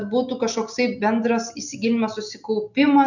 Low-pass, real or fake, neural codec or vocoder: 7.2 kHz; real; none